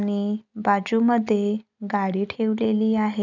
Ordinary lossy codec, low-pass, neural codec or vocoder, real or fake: none; 7.2 kHz; none; real